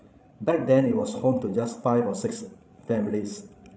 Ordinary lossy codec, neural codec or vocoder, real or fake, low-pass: none; codec, 16 kHz, 16 kbps, FreqCodec, larger model; fake; none